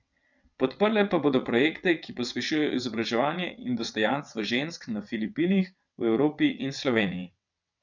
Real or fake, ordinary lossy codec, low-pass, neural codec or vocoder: fake; none; 7.2 kHz; vocoder, 22.05 kHz, 80 mel bands, WaveNeXt